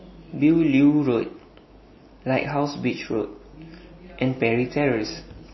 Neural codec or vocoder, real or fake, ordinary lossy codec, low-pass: none; real; MP3, 24 kbps; 7.2 kHz